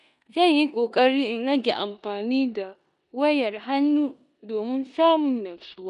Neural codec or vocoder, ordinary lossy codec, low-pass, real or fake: codec, 16 kHz in and 24 kHz out, 0.9 kbps, LongCat-Audio-Codec, four codebook decoder; none; 10.8 kHz; fake